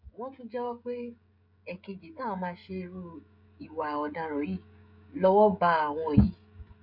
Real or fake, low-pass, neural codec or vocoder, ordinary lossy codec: fake; 5.4 kHz; codec, 16 kHz, 16 kbps, FreqCodec, smaller model; none